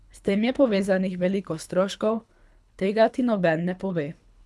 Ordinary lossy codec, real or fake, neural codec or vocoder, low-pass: none; fake; codec, 24 kHz, 3 kbps, HILCodec; none